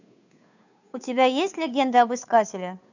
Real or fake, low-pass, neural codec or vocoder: fake; 7.2 kHz; codec, 16 kHz, 2 kbps, FunCodec, trained on Chinese and English, 25 frames a second